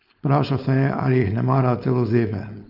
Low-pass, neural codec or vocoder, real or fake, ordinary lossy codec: 5.4 kHz; codec, 16 kHz, 4.8 kbps, FACodec; fake; none